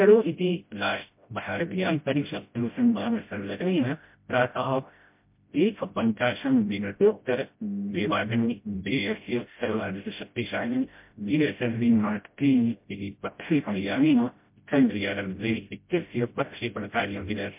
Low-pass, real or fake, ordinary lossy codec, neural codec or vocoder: 3.6 kHz; fake; MP3, 32 kbps; codec, 16 kHz, 0.5 kbps, FreqCodec, smaller model